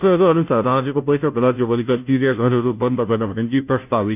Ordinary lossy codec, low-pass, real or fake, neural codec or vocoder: none; 3.6 kHz; fake; codec, 16 kHz, 0.5 kbps, FunCodec, trained on Chinese and English, 25 frames a second